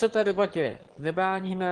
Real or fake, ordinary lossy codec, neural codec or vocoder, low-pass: fake; Opus, 16 kbps; autoencoder, 22.05 kHz, a latent of 192 numbers a frame, VITS, trained on one speaker; 9.9 kHz